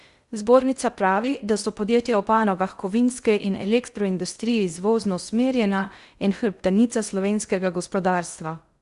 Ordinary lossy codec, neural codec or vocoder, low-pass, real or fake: Opus, 64 kbps; codec, 16 kHz in and 24 kHz out, 0.6 kbps, FocalCodec, streaming, 2048 codes; 10.8 kHz; fake